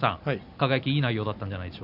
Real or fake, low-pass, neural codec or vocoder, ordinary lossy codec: real; 5.4 kHz; none; none